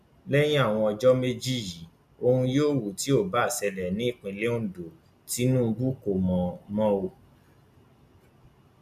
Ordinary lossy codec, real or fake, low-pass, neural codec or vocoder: none; real; 14.4 kHz; none